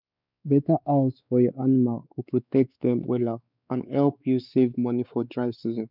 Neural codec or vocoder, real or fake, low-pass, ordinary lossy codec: codec, 16 kHz, 4 kbps, X-Codec, WavLM features, trained on Multilingual LibriSpeech; fake; 5.4 kHz; none